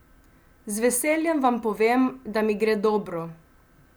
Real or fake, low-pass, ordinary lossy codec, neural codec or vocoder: real; none; none; none